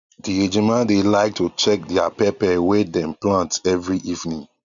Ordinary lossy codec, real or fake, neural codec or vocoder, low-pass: MP3, 96 kbps; real; none; 7.2 kHz